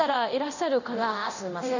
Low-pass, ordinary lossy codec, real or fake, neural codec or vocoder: 7.2 kHz; none; fake; codec, 24 kHz, 0.9 kbps, DualCodec